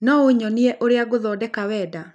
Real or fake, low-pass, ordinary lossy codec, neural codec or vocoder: real; none; none; none